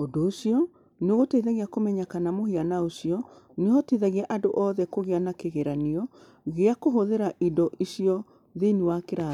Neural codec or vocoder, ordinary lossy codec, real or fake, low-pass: none; none; real; 19.8 kHz